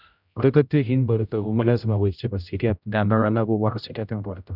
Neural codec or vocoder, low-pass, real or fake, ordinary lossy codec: codec, 16 kHz, 0.5 kbps, X-Codec, HuBERT features, trained on general audio; 5.4 kHz; fake; none